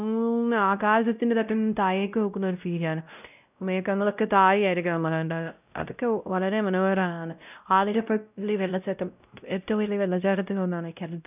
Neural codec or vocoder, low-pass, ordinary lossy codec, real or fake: codec, 16 kHz, 0.5 kbps, X-Codec, WavLM features, trained on Multilingual LibriSpeech; 3.6 kHz; none; fake